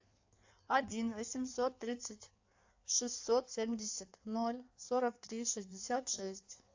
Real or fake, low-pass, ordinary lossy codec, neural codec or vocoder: fake; 7.2 kHz; AAC, 48 kbps; codec, 16 kHz in and 24 kHz out, 1.1 kbps, FireRedTTS-2 codec